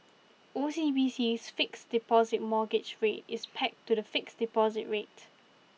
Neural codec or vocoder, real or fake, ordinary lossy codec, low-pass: none; real; none; none